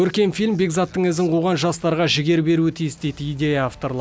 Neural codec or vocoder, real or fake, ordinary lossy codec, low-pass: none; real; none; none